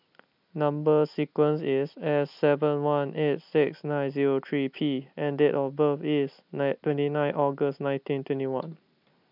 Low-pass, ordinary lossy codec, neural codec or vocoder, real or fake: 5.4 kHz; none; none; real